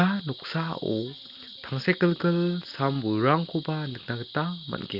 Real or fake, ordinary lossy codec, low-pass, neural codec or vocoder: real; Opus, 32 kbps; 5.4 kHz; none